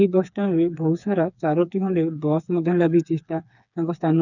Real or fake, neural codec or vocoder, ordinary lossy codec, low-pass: fake; codec, 16 kHz, 4 kbps, FreqCodec, smaller model; none; 7.2 kHz